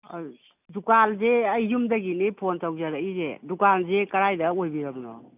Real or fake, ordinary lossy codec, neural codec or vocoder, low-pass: real; none; none; 3.6 kHz